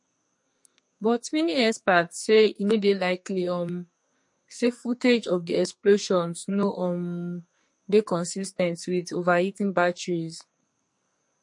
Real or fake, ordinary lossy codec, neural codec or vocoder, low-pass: fake; MP3, 48 kbps; codec, 44.1 kHz, 2.6 kbps, SNAC; 10.8 kHz